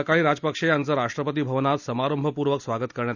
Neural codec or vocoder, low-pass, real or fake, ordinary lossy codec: none; 7.2 kHz; real; none